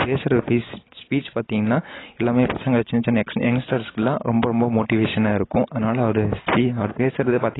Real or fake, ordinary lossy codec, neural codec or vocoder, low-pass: real; AAC, 16 kbps; none; 7.2 kHz